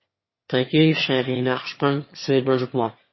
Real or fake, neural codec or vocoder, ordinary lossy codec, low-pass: fake; autoencoder, 22.05 kHz, a latent of 192 numbers a frame, VITS, trained on one speaker; MP3, 24 kbps; 7.2 kHz